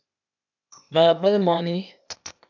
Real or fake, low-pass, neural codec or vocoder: fake; 7.2 kHz; codec, 16 kHz, 0.8 kbps, ZipCodec